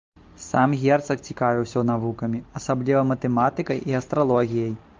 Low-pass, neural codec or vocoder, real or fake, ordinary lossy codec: 7.2 kHz; none; real; Opus, 24 kbps